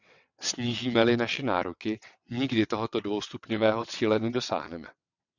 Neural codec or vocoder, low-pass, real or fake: vocoder, 22.05 kHz, 80 mel bands, WaveNeXt; 7.2 kHz; fake